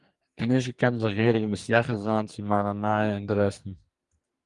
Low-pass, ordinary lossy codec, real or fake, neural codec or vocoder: 10.8 kHz; Opus, 32 kbps; fake; codec, 44.1 kHz, 2.6 kbps, SNAC